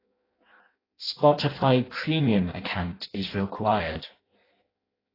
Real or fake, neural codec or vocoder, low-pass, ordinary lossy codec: fake; codec, 16 kHz in and 24 kHz out, 0.6 kbps, FireRedTTS-2 codec; 5.4 kHz; AAC, 24 kbps